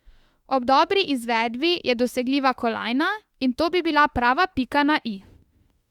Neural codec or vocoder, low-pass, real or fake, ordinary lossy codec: autoencoder, 48 kHz, 32 numbers a frame, DAC-VAE, trained on Japanese speech; 19.8 kHz; fake; Opus, 64 kbps